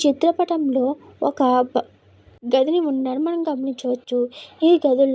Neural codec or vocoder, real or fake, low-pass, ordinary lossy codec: none; real; none; none